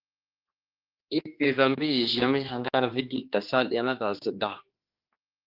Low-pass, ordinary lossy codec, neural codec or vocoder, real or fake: 5.4 kHz; Opus, 24 kbps; codec, 16 kHz, 2 kbps, X-Codec, HuBERT features, trained on general audio; fake